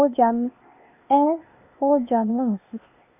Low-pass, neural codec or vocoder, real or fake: 3.6 kHz; codec, 16 kHz, 0.8 kbps, ZipCodec; fake